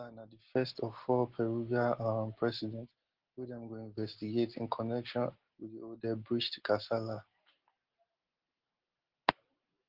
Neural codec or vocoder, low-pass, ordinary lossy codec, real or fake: none; 5.4 kHz; Opus, 16 kbps; real